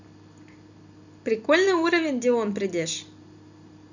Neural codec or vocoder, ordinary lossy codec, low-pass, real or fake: vocoder, 44.1 kHz, 128 mel bands every 256 samples, BigVGAN v2; none; 7.2 kHz; fake